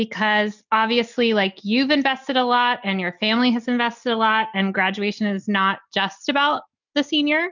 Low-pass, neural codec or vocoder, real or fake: 7.2 kHz; none; real